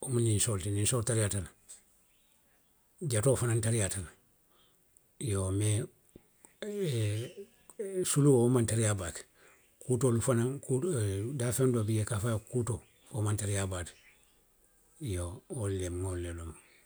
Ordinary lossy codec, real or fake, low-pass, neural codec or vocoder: none; real; none; none